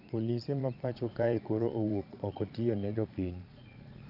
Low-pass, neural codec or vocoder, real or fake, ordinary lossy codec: 5.4 kHz; vocoder, 22.05 kHz, 80 mel bands, Vocos; fake; none